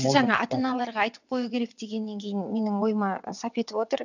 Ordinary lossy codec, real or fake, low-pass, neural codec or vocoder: none; fake; 7.2 kHz; vocoder, 22.05 kHz, 80 mel bands, WaveNeXt